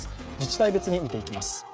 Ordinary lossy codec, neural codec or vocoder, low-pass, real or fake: none; codec, 16 kHz, 16 kbps, FreqCodec, smaller model; none; fake